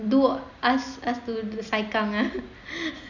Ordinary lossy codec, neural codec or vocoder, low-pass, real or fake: none; none; 7.2 kHz; real